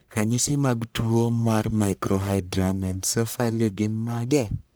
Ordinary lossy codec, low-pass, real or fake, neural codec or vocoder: none; none; fake; codec, 44.1 kHz, 1.7 kbps, Pupu-Codec